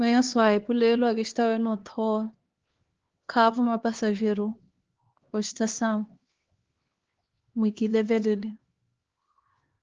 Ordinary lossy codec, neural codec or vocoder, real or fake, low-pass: Opus, 16 kbps; codec, 16 kHz, 2 kbps, X-Codec, HuBERT features, trained on LibriSpeech; fake; 7.2 kHz